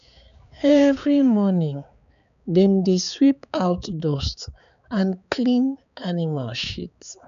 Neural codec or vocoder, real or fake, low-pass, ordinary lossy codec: codec, 16 kHz, 4 kbps, X-Codec, HuBERT features, trained on balanced general audio; fake; 7.2 kHz; none